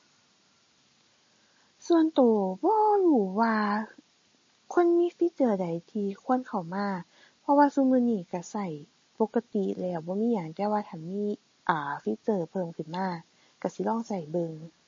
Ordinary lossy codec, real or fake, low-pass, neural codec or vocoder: MP3, 32 kbps; real; 7.2 kHz; none